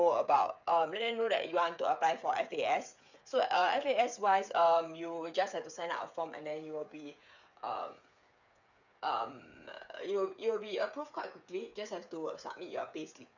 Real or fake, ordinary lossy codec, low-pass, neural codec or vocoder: fake; none; 7.2 kHz; codec, 16 kHz, 8 kbps, FreqCodec, smaller model